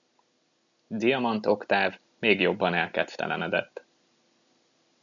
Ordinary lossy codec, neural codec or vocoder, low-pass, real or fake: MP3, 96 kbps; none; 7.2 kHz; real